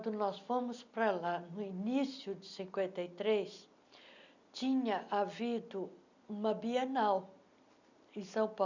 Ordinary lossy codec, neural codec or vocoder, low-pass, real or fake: none; none; 7.2 kHz; real